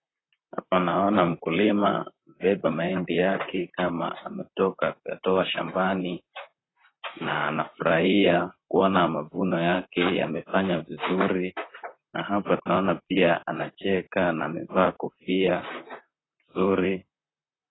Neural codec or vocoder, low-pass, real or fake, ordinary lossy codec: vocoder, 44.1 kHz, 128 mel bands, Pupu-Vocoder; 7.2 kHz; fake; AAC, 16 kbps